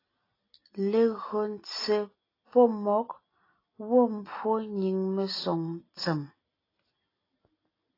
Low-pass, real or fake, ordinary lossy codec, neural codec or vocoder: 5.4 kHz; real; AAC, 24 kbps; none